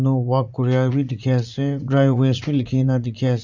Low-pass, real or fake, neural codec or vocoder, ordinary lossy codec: 7.2 kHz; fake; vocoder, 44.1 kHz, 80 mel bands, Vocos; none